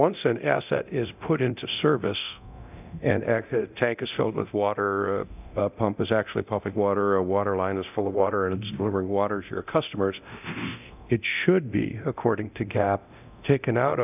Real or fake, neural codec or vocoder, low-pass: fake; codec, 24 kHz, 0.9 kbps, DualCodec; 3.6 kHz